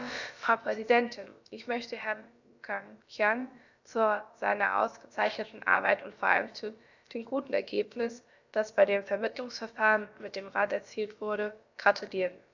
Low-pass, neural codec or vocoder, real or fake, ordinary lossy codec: 7.2 kHz; codec, 16 kHz, about 1 kbps, DyCAST, with the encoder's durations; fake; none